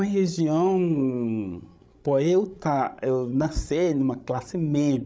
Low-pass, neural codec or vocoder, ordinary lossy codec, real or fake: none; codec, 16 kHz, 16 kbps, FreqCodec, larger model; none; fake